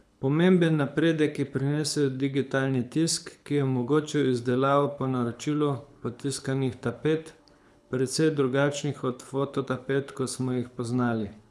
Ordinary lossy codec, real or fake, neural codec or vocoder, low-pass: none; fake; codec, 24 kHz, 6 kbps, HILCodec; none